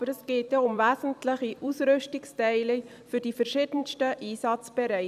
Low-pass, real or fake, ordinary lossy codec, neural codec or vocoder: 14.4 kHz; fake; none; vocoder, 44.1 kHz, 128 mel bands every 256 samples, BigVGAN v2